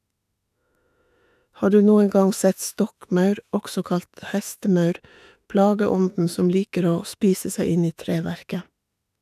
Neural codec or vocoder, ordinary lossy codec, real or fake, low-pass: autoencoder, 48 kHz, 32 numbers a frame, DAC-VAE, trained on Japanese speech; none; fake; 14.4 kHz